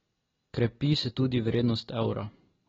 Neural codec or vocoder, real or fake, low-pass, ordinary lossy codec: none; real; 7.2 kHz; AAC, 24 kbps